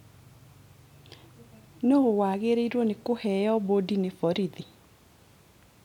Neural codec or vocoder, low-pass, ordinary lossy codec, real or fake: none; 19.8 kHz; none; real